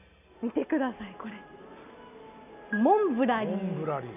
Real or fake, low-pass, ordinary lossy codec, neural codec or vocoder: real; 3.6 kHz; none; none